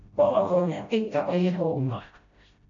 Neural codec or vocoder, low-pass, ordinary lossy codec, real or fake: codec, 16 kHz, 0.5 kbps, FreqCodec, smaller model; 7.2 kHz; MP3, 48 kbps; fake